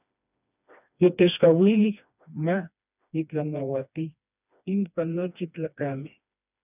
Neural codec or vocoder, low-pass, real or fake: codec, 16 kHz, 2 kbps, FreqCodec, smaller model; 3.6 kHz; fake